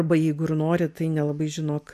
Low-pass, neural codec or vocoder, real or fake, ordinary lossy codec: 14.4 kHz; none; real; MP3, 96 kbps